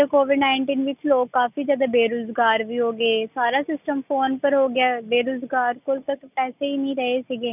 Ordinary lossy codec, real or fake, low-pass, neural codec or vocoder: none; real; 3.6 kHz; none